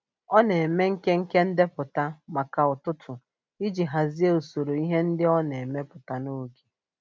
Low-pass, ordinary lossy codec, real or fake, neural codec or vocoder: none; none; real; none